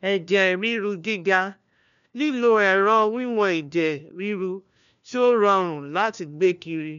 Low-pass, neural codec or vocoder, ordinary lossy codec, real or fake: 7.2 kHz; codec, 16 kHz, 1 kbps, FunCodec, trained on LibriTTS, 50 frames a second; none; fake